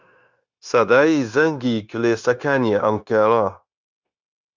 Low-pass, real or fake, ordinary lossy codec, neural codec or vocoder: 7.2 kHz; fake; Opus, 64 kbps; codec, 16 kHz, 0.9 kbps, LongCat-Audio-Codec